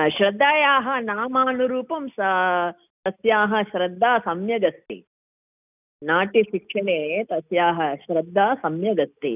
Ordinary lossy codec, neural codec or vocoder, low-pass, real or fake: none; none; 3.6 kHz; real